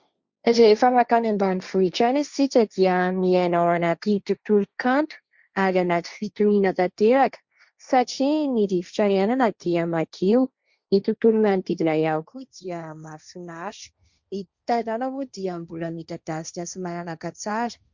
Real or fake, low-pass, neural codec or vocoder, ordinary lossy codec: fake; 7.2 kHz; codec, 16 kHz, 1.1 kbps, Voila-Tokenizer; Opus, 64 kbps